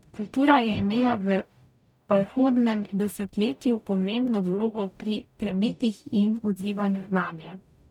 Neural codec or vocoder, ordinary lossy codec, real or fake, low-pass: codec, 44.1 kHz, 0.9 kbps, DAC; none; fake; 19.8 kHz